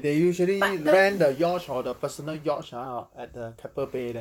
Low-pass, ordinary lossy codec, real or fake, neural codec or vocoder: 19.8 kHz; none; fake; vocoder, 44.1 kHz, 128 mel bands, Pupu-Vocoder